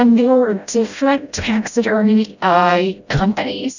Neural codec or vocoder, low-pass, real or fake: codec, 16 kHz, 0.5 kbps, FreqCodec, smaller model; 7.2 kHz; fake